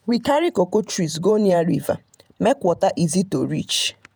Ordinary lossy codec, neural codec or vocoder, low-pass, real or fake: none; vocoder, 48 kHz, 128 mel bands, Vocos; none; fake